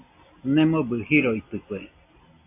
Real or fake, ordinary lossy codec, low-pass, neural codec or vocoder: real; AAC, 24 kbps; 3.6 kHz; none